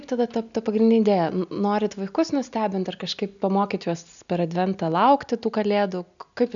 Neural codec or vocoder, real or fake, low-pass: none; real; 7.2 kHz